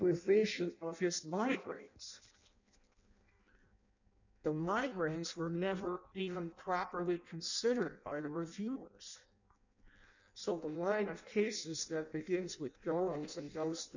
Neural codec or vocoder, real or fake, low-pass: codec, 16 kHz in and 24 kHz out, 0.6 kbps, FireRedTTS-2 codec; fake; 7.2 kHz